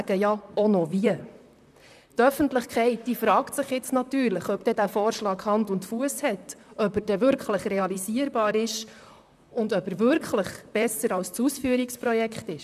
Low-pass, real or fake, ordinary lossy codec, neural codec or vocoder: 14.4 kHz; fake; none; vocoder, 44.1 kHz, 128 mel bands, Pupu-Vocoder